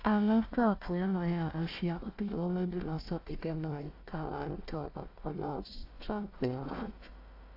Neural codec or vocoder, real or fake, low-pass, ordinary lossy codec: codec, 16 kHz, 1 kbps, FunCodec, trained on Chinese and English, 50 frames a second; fake; 5.4 kHz; AAC, 32 kbps